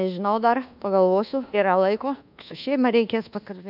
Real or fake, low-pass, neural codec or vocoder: fake; 5.4 kHz; codec, 24 kHz, 1.2 kbps, DualCodec